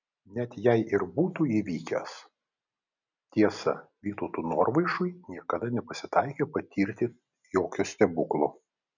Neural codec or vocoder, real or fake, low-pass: none; real; 7.2 kHz